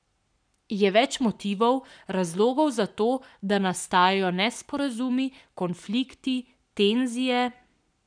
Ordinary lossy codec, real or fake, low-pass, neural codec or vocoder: none; fake; 9.9 kHz; codec, 44.1 kHz, 7.8 kbps, Pupu-Codec